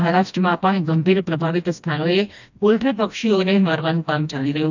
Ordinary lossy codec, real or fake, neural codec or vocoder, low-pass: none; fake; codec, 16 kHz, 1 kbps, FreqCodec, smaller model; 7.2 kHz